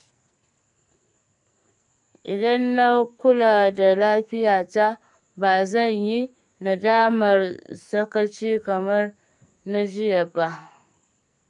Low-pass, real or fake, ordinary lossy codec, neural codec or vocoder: 10.8 kHz; fake; none; codec, 44.1 kHz, 2.6 kbps, SNAC